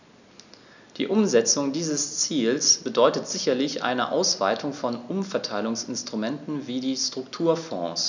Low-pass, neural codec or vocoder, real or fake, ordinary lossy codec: 7.2 kHz; none; real; none